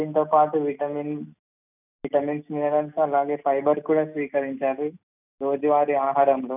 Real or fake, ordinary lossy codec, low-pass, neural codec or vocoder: real; none; 3.6 kHz; none